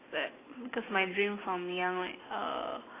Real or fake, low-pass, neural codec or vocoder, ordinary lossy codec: real; 3.6 kHz; none; AAC, 16 kbps